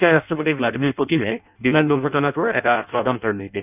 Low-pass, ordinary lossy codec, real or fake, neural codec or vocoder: 3.6 kHz; none; fake; codec, 16 kHz in and 24 kHz out, 0.6 kbps, FireRedTTS-2 codec